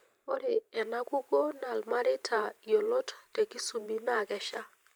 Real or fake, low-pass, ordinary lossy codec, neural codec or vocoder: fake; none; none; vocoder, 44.1 kHz, 128 mel bands every 512 samples, BigVGAN v2